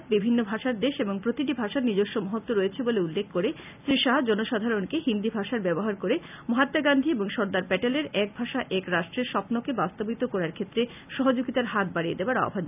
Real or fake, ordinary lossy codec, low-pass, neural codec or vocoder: real; none; 3.6 kHz; none